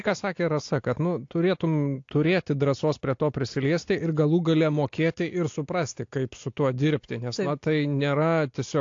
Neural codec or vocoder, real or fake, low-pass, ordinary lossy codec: none; real; 7.2 kHz; AAC, 48 kbps